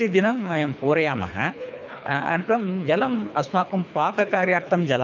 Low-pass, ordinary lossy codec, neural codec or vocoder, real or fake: 7.2 kHz; none; codec, 24 kHz, 3 kbps, HILCodec; fake